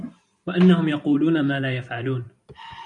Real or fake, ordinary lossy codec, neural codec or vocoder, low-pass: fake; MP3, 64 kbps; vocoder, 44.1 kHz, 128 mel bands every 512 samples, BigVGAN v2; 10.8 kHz